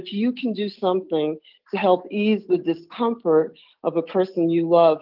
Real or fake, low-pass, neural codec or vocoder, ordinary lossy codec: real; 5.4 kHz; none; Opus, 32 kbps